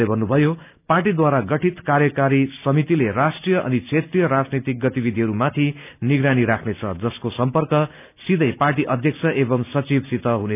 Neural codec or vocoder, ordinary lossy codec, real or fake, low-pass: none; none; real; 3.6 kHz